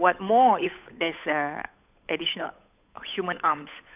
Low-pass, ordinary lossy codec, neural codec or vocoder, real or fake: 3.6 kHz; AAC, 32 kbps; codec, 16 kHz, 8 kbps, FunCodec, trained on Chinese and English, 25 frames a second; fake